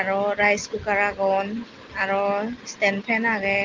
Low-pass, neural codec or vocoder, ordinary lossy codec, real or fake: 7.2 kHz; none; Opus, 32 kbps; real